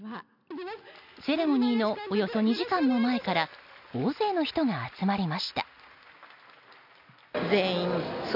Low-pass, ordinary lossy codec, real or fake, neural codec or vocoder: 5.4 kHz; none; real; none